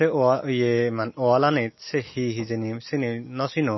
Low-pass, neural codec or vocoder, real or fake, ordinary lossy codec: 7.2 kHz; none; real; MP3, 24 kbps